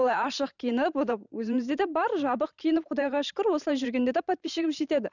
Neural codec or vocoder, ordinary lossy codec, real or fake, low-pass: vocoder, 44.1 kHz, 128 mel bands every 512 samples, BigVGAN v2; none; fake; 7.2 kHz